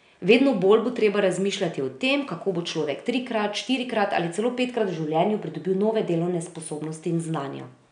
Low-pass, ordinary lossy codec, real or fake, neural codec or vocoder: 9.9 kHz; none; real; none